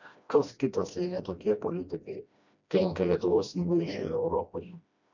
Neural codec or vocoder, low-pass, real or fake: codec, 16 kHz, 1 kbps, FreqCodec, smaller model; 7.2 kHz; fake